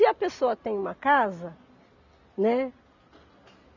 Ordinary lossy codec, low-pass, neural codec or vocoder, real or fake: none; 7.2 kHz; none; real